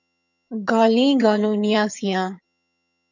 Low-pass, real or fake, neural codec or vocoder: 7.2 kHz; fake; vocoder, 22.05 kHz, 80 mel bands, HiFi-GAN